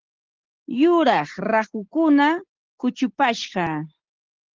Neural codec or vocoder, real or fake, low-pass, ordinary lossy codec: none; real; 7.2 kHz; Opus, 16 kbps